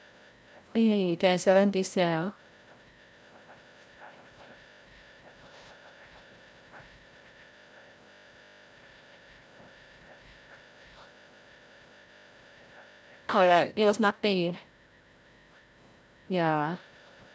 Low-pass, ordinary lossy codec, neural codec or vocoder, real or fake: none; none; codec, 16 kHz, 0.5 kbps, FreqCodec, larger model; fake